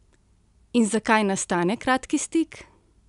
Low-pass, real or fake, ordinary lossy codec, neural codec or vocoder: 10.8 kHz; real; none; none